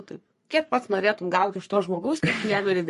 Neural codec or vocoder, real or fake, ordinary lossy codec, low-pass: codec, 44.1 kHz, 3.4 kbps, Pupu-Codec; fake; MP3, 48 kbps; 14.4 kHz